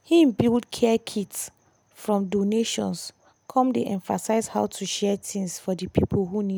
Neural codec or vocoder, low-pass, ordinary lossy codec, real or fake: none; none; none; real